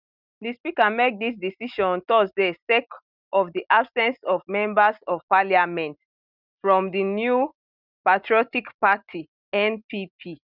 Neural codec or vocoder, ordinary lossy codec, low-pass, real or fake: none; none; 5.4 kHz; real